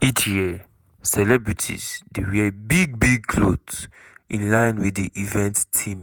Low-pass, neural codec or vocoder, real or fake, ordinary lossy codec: none; vocoder, 48 kHz, 128 mel bands, Vocos; fake; none